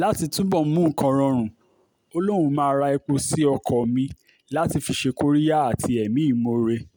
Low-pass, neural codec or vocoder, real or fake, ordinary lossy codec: none; none; real; none